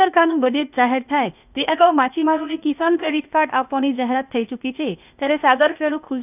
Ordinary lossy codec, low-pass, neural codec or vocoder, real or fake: none; 3.6 kHz; codec, 16 kHz, 0.8 kbps, ZipCodec; fake